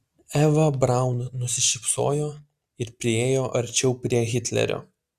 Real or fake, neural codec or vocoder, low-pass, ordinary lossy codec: real; none; 14.4 kHz; Opus, 64 kbps